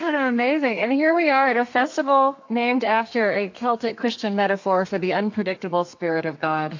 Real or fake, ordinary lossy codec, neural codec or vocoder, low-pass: fake; AAC, 48 kbps; codec, 32 kHz, 1.9 kbps, SNAC; 7.2 kHz